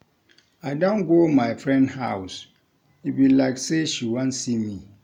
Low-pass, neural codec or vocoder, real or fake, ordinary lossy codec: 19.8 kHz; none; real; none